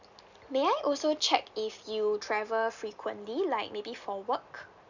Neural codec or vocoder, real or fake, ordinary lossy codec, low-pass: none; real; none; 7.2 kHz